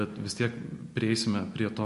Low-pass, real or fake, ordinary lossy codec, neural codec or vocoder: 14.4 kHz; real; MP3, 48 kbps; none